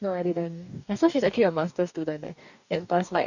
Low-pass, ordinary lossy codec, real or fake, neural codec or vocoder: 7.2 kHz; none; fake; codec, 44.1 kHz, 2.6 kbps, DAC